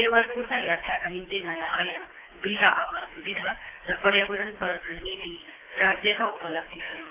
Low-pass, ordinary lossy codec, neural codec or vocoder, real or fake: 3.6 kHz; AAC, 24 kbps; codec, 24 kHz, 3 kbps, HILCodec; fake